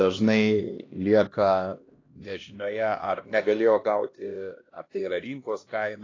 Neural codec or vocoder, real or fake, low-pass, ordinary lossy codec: codec, 16 kHz, 1 kbps, X-Codec, HuBERT features, trained on LibriSpeech; fake; 7.2 kHz; AAC, 32 kbps